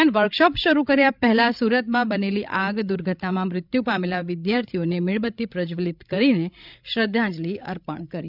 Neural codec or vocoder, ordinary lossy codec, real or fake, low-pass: codec, 16 kHz, 16 kbps, FreqCodec, larger model; none; fake; 5.4 kHz